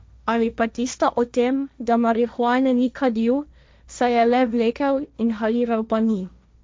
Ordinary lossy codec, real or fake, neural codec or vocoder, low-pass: none; fake; codec, 16 kHz, 1.1 kbps, Voila-Tokenizer; none